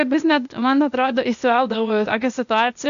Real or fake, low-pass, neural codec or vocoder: fake; 7.2 kHz; codec, 16 kHz, 0.8 kbps, ZipCodec